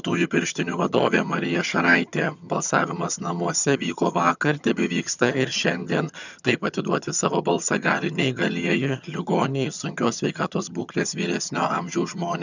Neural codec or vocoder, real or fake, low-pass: vocoder, 22.05 kHz, 80 mel bands, HiFi-GAN; fake; 7.2 kHz